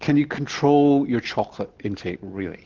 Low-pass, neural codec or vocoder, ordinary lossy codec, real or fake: 7.2 kHz; none; Opus, 16 kbps; real